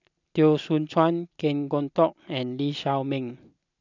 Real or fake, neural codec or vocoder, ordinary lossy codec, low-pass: real; none; none; 7.2 kHz